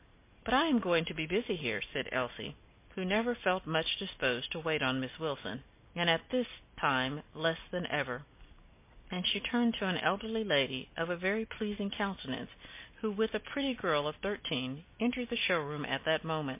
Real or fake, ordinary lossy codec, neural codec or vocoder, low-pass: real; MP3, 24 kbps; none; 3.6 kHz